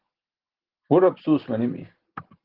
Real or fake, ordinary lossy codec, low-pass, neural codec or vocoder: real; Opus, 16 kbps; 5.4 kHz; none